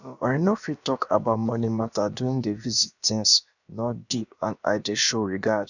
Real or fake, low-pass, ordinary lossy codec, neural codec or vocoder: fake; 7.2 kHz; none; codec, 16 kHz, about 1 kbps, DyCAST, with the encoder's durations